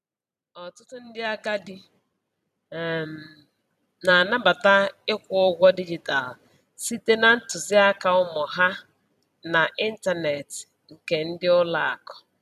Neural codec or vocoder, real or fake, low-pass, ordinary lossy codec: vocoder, 44.1 kHz, 128 mel bands every 256 samples, BigVGAN v2; fake; 14.4 kHz; none